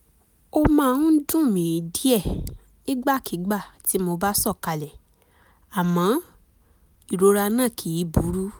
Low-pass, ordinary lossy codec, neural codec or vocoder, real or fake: none; none; none; real